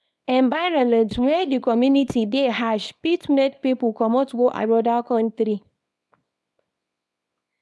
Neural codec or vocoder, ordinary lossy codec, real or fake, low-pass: codec, 24 kHz, 0.9 kbps, WavTokenizer, small release; none; fake; none